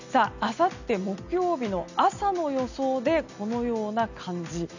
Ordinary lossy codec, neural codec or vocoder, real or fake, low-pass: none; none; real; 7.2 kHz